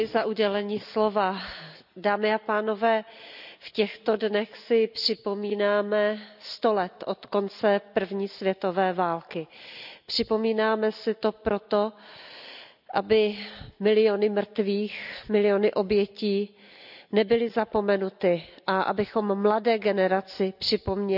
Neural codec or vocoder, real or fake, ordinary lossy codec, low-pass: none; real; none; 5.4 kHz